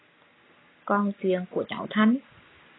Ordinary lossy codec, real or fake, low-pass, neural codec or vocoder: AAC, 16 kbps; real; 7.2 kHz; none